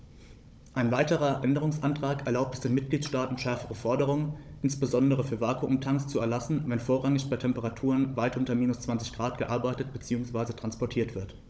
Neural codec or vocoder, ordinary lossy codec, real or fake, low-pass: codec, 16 kHz, 16 kbps, FunCodec, trained on Chinese and English, 50 frames a second; none; fake; none